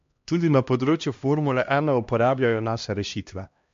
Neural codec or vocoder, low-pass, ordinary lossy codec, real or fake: codec, 16 kHz, 1 kbps, X-Codec, HuBERT features, trained on LibriSpeech; 7.2 kHz; MP3, 48 kbps; fake